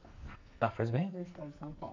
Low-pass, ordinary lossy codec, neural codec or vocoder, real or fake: 7.2 kHz; MP3, 64 kbps; codec, 44.1 kHz, 2.6 kbps, SNAC; fake